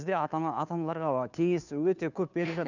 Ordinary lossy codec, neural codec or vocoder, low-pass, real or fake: none; codec, 16 kHz, 2 kbps, FunCodec, trained on Chinese and English, 25 frames a second; 7.2 kHz; fake